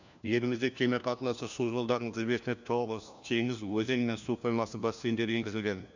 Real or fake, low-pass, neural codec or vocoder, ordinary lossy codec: fake; 7.2 kHz; codec, 16 kHz, 1 kbps, FunCodec, trained on LibriTTS, 50 frames a second; none